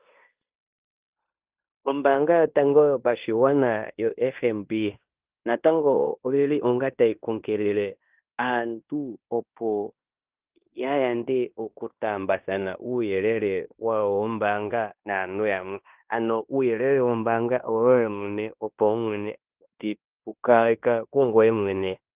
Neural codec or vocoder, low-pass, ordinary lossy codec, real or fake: codec, 16 kHz in and 24 kHz out, 0.9 kbps, LongCat-Audio-Codec, fine tuned four codebook decoder; 3.6 kHz; Opus, 32 kbps; fake